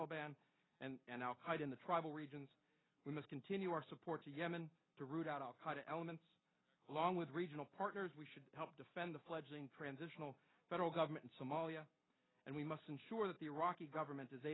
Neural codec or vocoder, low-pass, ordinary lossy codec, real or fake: none; 7.2 kHz; AAC, 16 kbps; real